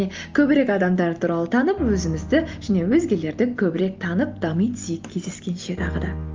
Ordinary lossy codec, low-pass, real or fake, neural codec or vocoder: Opus, 24 kbps; 7.2 kHz; real; none